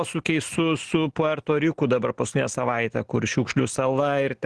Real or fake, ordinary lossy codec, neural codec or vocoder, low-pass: real; Opus, 16 kbps; none; 10.8 kHz